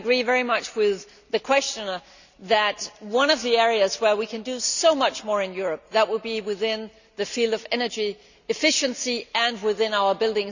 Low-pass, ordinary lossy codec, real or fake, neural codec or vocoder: 7.2 kHz; none; real; none